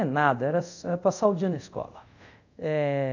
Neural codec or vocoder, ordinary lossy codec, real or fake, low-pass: codec, 24 kHz, 0.5 kbps, DualCodec; none; fake; 7.2 kHz